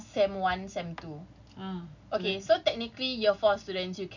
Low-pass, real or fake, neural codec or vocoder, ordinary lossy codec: 7.2 kHz; real; none; none